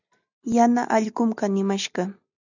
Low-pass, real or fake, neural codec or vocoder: 7.2 kHz; real; none